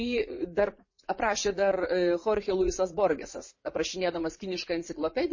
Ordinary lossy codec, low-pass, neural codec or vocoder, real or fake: MP3, 32 kbps; 7.2 kHz; vocoder, 44.1 kHz, 80 mel bands, Vocos; fake